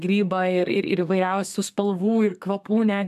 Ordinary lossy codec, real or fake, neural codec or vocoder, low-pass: AAC, 96 kbps; fake; codec, 32 kHz, 1.9 kbps, SNAC; 14.4 kHz